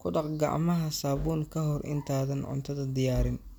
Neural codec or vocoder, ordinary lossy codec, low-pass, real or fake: none; none; none; real